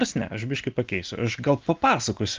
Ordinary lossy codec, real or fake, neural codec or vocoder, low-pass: Opus, 24 kbps; real; none; 7.2 kHz